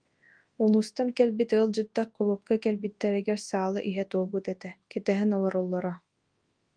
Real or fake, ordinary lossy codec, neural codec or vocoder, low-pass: fake; Opus, 24 kbps; codec, 24 kHz, 0.9 kbps, WavTokenizer, large speech release; 9.9 kHz